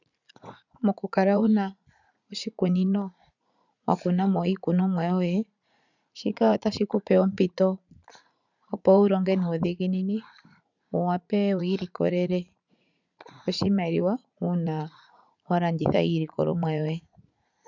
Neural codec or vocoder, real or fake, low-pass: vocoder, 44.1 kHz, 80 mel bands, Vocos; fake; 7.2 kHz